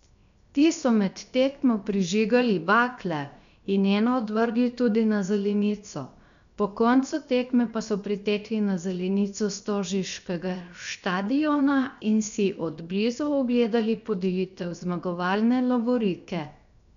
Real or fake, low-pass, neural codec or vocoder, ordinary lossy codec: fake; 7.2 kHz; codec, 16 kHz, 0.7 kbps, FocalCodec; none